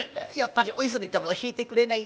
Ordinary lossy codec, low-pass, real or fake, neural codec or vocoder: none; none; fake; codec, 16 kHz, 0.8 kbps, ZipCodec